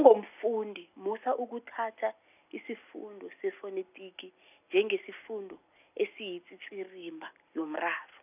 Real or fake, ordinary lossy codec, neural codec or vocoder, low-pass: real; none; none; 3.6 kHz